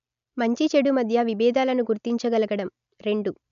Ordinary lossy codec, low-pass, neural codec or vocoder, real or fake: none; 7.2 kHz; none; real